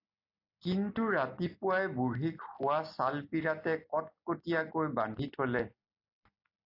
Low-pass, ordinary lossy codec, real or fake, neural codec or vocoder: 5.4 kHz; AAC, 32 kbps; real; none